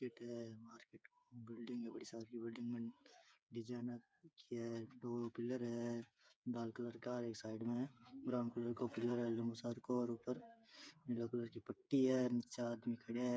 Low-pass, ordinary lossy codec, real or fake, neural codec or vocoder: none; none; fake; codec, 16 kHz, 8 kbps, FreqCodec, smaller model